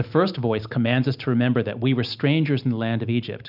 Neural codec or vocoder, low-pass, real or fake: none; 5.4 kHz; real